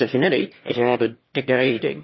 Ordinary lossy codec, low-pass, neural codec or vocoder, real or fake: MP3, 24 kbps; 7.2 kHz; autoencoder, 22.05 kHz, a latent of 192 numbers a frame, VITS, trained on one speaker; fake